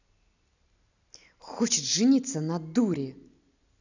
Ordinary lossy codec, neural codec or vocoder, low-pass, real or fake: none; none; 7.2 kHz; real